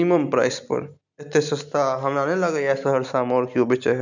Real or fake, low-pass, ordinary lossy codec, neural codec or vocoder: real; 7.2 kHz; none; none